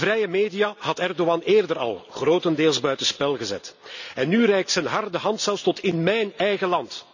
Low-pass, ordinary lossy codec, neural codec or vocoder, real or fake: 7.2 kHz; none; none; real